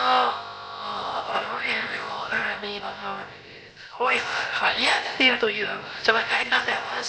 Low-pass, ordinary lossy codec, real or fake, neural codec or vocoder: none; none; fake; codec, 16 kHz, about 1 kbps, DyCAST, with the encoder's durations